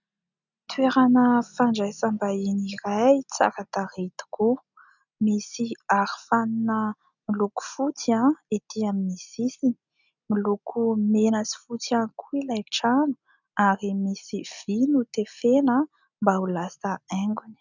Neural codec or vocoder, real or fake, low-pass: none; real; 7.2 kHz